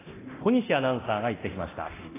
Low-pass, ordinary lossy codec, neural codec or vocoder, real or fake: 3.6 kHz; AAC, 16 kbps; codec, 24 kHz, 0.9 kbps, DualCodec; fake